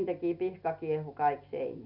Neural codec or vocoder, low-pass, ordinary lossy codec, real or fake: none; 5.4 kHz; none; real